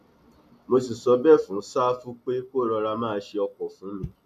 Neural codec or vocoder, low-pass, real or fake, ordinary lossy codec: none; 14.4 kHz; real; none